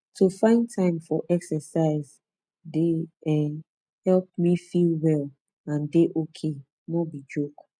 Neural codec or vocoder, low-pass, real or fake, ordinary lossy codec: none; none; real; none